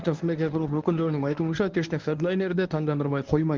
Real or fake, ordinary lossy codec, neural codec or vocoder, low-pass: fake; Opus, 32 kbps; codec, 24 kHz, 0.9 kbps, WavTokenizer, medium speech release version 1; 7.2 kHz